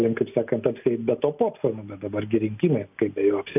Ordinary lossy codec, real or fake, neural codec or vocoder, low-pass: AAC, 32 kbps; real; none; 3.6 kHz